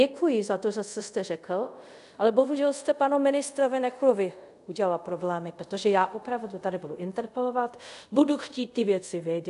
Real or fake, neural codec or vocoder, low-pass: fake; codec, 24 kHz, 0.5 kbps, DualCodec; 10.8 kHz